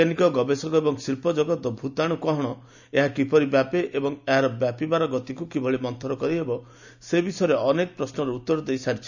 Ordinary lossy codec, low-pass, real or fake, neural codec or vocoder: none; 7.2 kHz; real; none